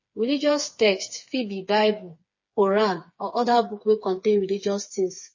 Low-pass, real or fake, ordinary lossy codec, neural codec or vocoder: 7.2 kHz; fake; MP3, 32 kbps; codec, 16 kHz, 4 kbps, FreqCodec, smaller model